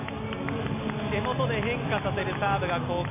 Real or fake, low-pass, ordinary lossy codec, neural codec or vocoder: real; 3.6 kHz; none; none